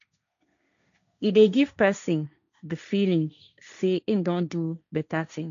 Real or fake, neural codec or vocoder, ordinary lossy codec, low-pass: fake; codec, 16 kHz, 1.1 kbps, Voila-Tokenizer; MP3, 96 kbps; 7.2 kHz